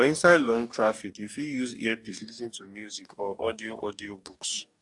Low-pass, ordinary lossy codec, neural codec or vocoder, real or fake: 10.8 kHz; AAC, 64 kbps; codec, 44.1 kHz, 2.6 kbps, DAC; fake